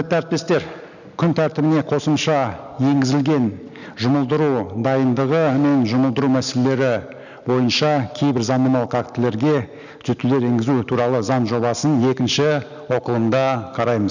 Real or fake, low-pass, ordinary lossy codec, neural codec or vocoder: real; 7.2 kHz; none; none